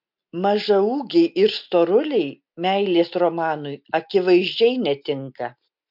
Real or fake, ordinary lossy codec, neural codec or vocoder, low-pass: real; MP3, 48 kbps; none; 5.4 kHz